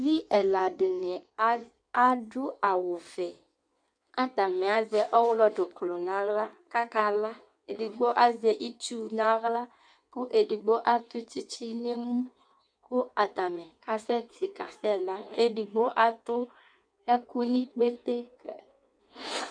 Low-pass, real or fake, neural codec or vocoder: 9.9 kHz; fake; codec, 16 kHz in and 24 kHz out, 1.1 kbps, FireRedTTS-2 codec